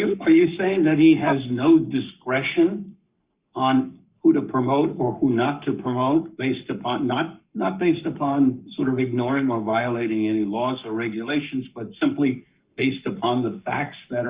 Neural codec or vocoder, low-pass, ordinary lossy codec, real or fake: codec, 44.1 kHz, 7.8 kbps, Pupu-Codec; 3.6 kHz; Opus, 64 kbps; fake